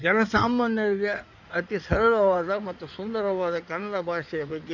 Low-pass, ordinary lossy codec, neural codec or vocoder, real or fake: 7.2 kHz; none; codec, 16 kHz in and 24 kHz out, 2.2 kbps, FireRedTTS-2 codec; fake